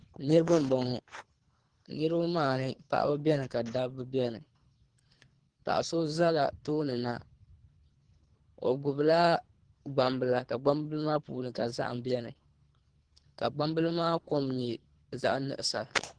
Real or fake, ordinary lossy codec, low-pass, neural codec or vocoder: fake; Opus, 16 kbps; 9.9 kHz; codec, 24 kHz, 3 kbps, HILCodec